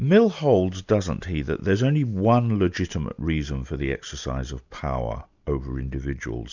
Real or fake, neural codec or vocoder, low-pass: real; none; 7.2 kHz